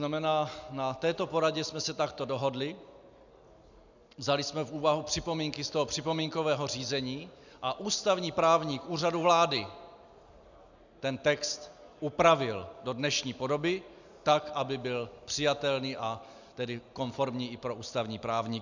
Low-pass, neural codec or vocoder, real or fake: 7.2 kHz; none; real